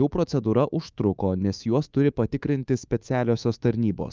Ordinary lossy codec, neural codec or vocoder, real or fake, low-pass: Opus, 24 kbps; codec, 24 kHz, 3.1 kbps, DualCodec; fake; 7.2 kHz